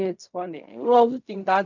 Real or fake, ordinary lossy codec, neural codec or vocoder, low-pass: fake; none; codec, 16 kHz in and 24 kHz out, 0.4 kbps, LongCat-Audio-Codec, fine tuned four codebook decoder; 7.2 kHz